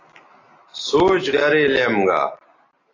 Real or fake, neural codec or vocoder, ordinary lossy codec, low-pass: real; none; AAC, 32 kbps; 7.2 kHz